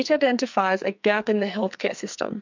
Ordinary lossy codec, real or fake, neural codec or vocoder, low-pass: MP3, 64 kbps; fake; codec, 44.1 kHz, 2.6 kbps, SNAC; 7.2 kHz